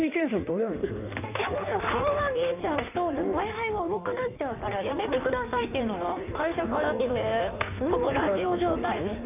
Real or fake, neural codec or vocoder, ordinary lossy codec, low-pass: fake; codec, 16 kHz in and 24 kHz out, 1.1 kbps, FireRedTTS-2 codec; none; 3.6 kHz